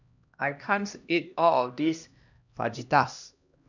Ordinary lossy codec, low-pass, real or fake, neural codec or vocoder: none; 7.2 kHz; fake; codec, 16 kHz, 1 kbps, X-Codec, HuBERT features, trained on LibriSpeech